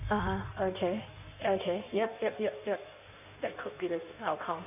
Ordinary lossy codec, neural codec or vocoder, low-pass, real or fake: none; codec, 16 kHz in and 24 kHz out, 1.1 kbps, FireRedTTS-2 codec; 3.6 kHz; fake